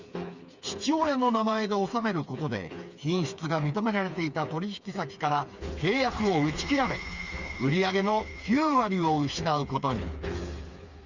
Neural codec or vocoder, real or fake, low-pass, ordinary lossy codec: codec, 16 kHz, 4 kbps, FreqCodec, smaller model; fake; 7.2 kHz; Opus, 64 kbps